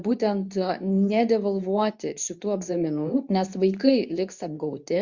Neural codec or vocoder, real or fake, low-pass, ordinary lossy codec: codec, 24 kHz, 0.9 kbps, WavTokenizer, medium speech release version 2; fake; 7.2 kHz; Opus, 64 kbps